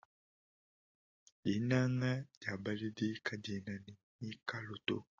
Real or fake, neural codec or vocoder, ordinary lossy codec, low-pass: real; none; AAC, 32 kbps; 7.2 kHz